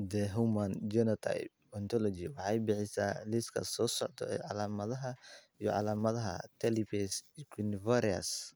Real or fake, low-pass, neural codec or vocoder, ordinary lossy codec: real; none; none; none